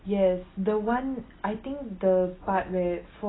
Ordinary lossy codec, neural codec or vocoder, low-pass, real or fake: AAC, 16 kbps; none; 7.2 kHz; real